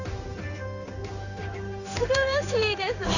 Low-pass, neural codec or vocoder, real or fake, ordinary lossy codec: 7.2 kHz; codec, 16 kHz in and 24 kHz out, 1 kbps, XY-Tokenizer; fake; none